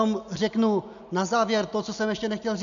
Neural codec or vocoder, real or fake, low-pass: none; real; 7.2 kHz